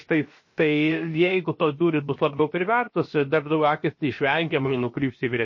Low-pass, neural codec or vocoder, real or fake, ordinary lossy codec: 7.2 kHz; codec, 16 kHz, about 1 kbps, DyCAST, with the encoder's durations; fake; MP3, 32 kbps